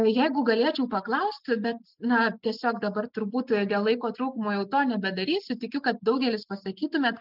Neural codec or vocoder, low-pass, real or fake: none; 5.4 kHz; real